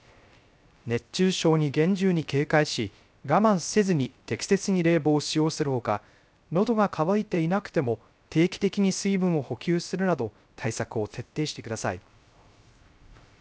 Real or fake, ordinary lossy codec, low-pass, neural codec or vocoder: fake; none; none; codec, 16 kHz, 0.3 kbps, FocalCodec